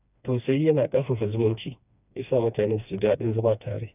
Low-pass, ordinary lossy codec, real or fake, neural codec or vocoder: 3.6 kHz; none; fake; codec, 16 kHz, 2 kbps, FreqCodec, smaller model